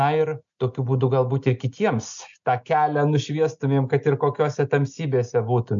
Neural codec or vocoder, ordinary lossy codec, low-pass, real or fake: none; MP3, 64 kbps; 7.2 kHz; real